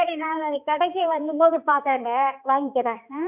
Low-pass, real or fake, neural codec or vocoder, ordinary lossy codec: 3.6 kHz; fake; codec, 16 kHz, 2 kbps, X-Codec, HuBERT features, trained on balanced general audio; none